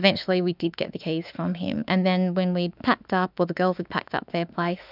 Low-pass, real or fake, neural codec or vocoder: 5.4 kHz; fake; autoencoder, 48 kHz, 32 numbers a frame, DAC-VAE, trained on Japanese speech